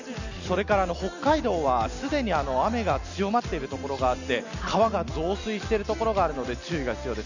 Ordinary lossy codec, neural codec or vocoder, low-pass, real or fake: none; none; 7.2 kHz; real